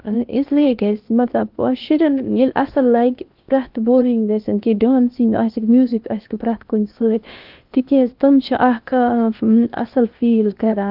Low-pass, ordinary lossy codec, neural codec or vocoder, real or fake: 5.4 kHz; Opus, 24 kbps; codec, 16 kHz in and 24 kHz out, 0.8 kbps, FocalCodec, streaming, 65536 codes; fake